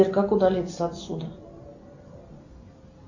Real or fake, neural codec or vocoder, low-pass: real; none; 7.2 kHz